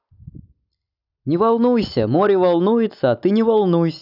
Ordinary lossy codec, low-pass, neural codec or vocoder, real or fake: none; 5.4 kHz; none; real